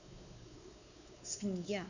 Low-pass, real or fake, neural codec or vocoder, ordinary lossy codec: 7.2 kHz; fake; codec, 16 kHz, 4 kbps, X-Codec, WavLM features, trained on Multilingual LibriSpeech; none